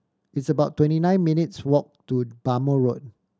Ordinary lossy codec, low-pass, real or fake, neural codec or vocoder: none; none; real; none